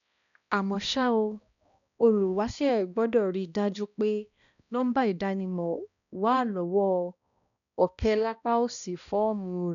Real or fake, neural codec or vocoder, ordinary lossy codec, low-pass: fake; codec, 16 kHz, 1 kbps, X-Codec, HuBERT features, trained on balanced general audio; none; 7.2 kHz